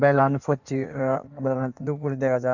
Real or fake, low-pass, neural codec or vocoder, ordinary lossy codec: fake; 7.2 kHz; codec, 16 kHz in and 24 kHz out, 1.1 kbps, FireRedTTS-2 codec; none